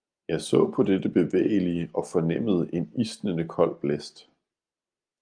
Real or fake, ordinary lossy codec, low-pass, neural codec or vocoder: real; Opus, 32 kbps; 9.9 kHz; none